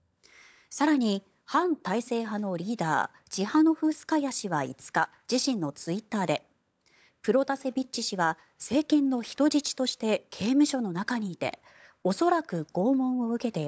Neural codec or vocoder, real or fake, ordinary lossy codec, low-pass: codec, 16 kHz, 16 kbps, FunCodec, trained on LibriTTS, 50 frames a second; fake; none; none